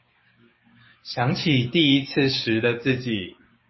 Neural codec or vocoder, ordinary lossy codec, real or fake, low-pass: codec, 16 kHz, 6 kbps, DAC; MP3, 24 kbps; fake; 7.2 kHz